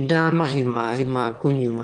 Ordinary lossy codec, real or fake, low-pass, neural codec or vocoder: Opus, 24 kbps; fake; 9.9 kHz; autoencoder, 22.05 kHz, a latent of 192 numbers a frame, VITS, trained on one speaker